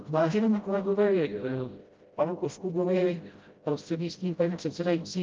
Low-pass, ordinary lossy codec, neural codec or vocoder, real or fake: 7.2 kHz; Opus, 24 kbps; codec, 16 kHz, 0.5 kbps, FreqCodec, smaller model; fake